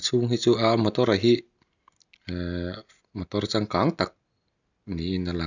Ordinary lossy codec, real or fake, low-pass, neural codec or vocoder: none; real; 7.2 kHz; none